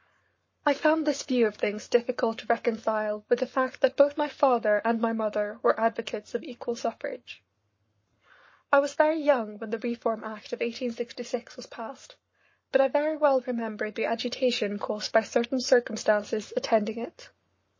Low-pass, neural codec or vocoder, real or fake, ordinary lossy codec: 7.2 kHz; codec, 44.1 kHz, 7.8 kbps, Pupu-Codec; fake; MP3, 32 kbps